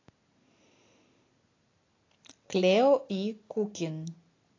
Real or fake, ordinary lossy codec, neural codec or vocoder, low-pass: real; AAC, 32 kbps; none; 7.2 kHz